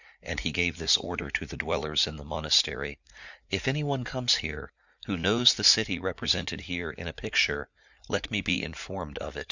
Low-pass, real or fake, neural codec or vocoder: 7.2 kHz; fake; vocoder, 44.1 kHz, 80 mel bands, Vocos